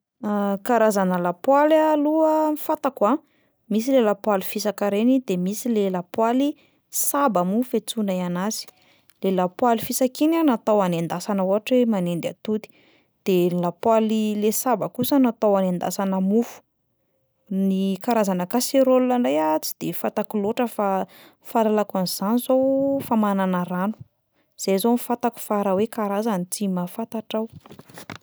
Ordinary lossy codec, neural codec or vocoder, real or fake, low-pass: none; none; real; none